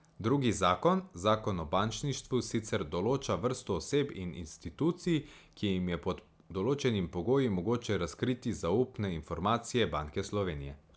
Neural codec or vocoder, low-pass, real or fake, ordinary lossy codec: none; none; real; none